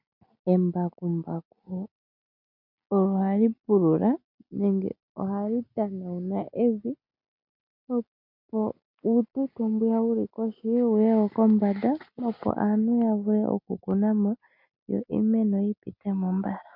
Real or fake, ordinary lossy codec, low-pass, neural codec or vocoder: real; MP3, 48 kbps; 5.4 kHz; none